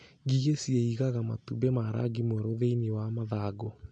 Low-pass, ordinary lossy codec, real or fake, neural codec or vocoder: 9.9 kHz; MP3, 48 kbps; real; none